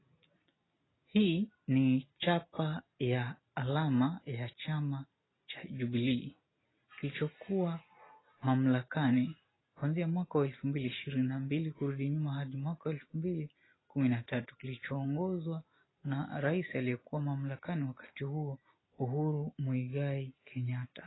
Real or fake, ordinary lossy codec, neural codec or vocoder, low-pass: real; AAC, 16 kbps; none; 7.2 kHz